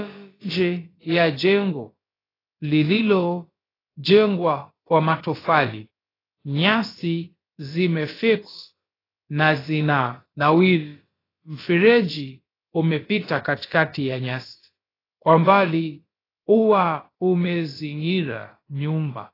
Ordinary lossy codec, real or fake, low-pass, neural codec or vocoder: AAC, 24 kbps; fake; 5.4 kHz; codec, 16 kHz, about 1 kbps, DyCAST, with the encoder's durations